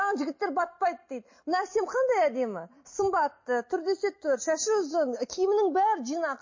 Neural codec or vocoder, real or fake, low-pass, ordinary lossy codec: none; real; 7.2 kHz; MP3, 32 kbps